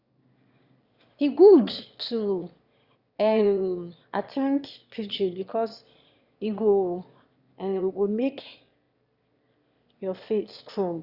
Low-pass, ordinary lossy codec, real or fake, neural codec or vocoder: 5.4 kHz; Opus, 64 kbps; fake; autoencoder, 22.05 kHz, a latent of 192 numbers a frame, VITS, trained on one speaker